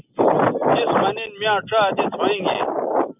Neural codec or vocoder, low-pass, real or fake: none; 3.6 kHz; real